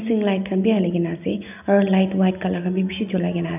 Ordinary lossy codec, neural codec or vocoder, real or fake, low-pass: none; none; real; 3.6 kHz